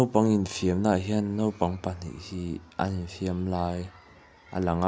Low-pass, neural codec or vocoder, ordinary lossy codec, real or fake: none; none; none; real